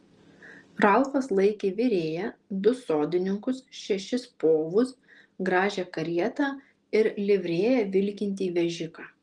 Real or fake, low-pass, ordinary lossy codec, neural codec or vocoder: real; 10.8 kHz; Opus, 24 kbps; none